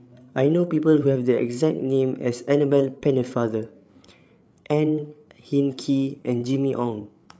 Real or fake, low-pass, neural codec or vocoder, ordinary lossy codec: fake; none; codec, 16 kHz, 8 kbps, FreqCodec, larger model; none